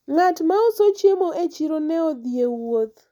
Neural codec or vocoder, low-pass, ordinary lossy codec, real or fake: none; 19.8 kHz; none; real